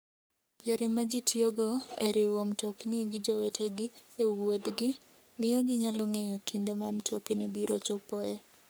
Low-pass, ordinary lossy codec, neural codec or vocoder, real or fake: none; none; codec, 44.1 kHz, 3.4 kbps, Pupu-Codec; fake